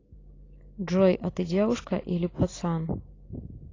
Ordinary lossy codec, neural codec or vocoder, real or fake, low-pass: AAC, 32 kbps; none; real; 7.2 kHz